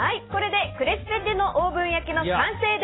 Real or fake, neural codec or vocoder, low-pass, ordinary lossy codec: real; none; 7.2 kHz; AAC, 16 kbps